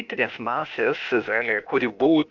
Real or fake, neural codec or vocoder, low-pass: fake; codec, 16 kHz, 0.8 kbps, ZipCodec; 7.2 kHz